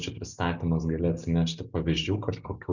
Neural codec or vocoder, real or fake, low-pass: none; real; 7.2 kHz